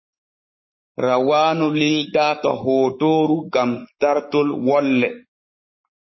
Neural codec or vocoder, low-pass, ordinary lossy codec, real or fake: codec, 44.1 kHz, 7.8 kbps, Pupu-Codec; 7.2 kHz; MP3, 24 kbps; fake